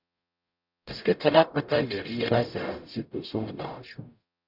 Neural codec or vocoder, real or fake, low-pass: codec, 44.1 kHz, 0.9 kbps, DAC; fake; 5.4 kHz